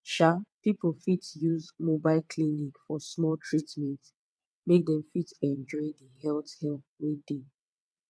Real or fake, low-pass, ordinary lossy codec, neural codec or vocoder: fake; none; none; vocoder, 22.05 kHz, 80 mel bands, WaveNeXt